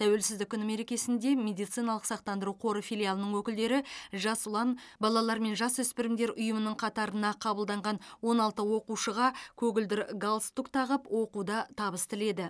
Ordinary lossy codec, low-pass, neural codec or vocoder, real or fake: none; none; none; real